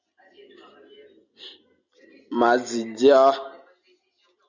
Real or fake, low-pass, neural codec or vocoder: real; 7.2 kHz; none